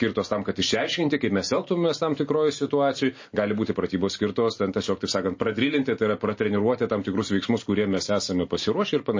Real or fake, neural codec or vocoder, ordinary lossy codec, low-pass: real; none; MP3, 32 kbps; 7.2 kHz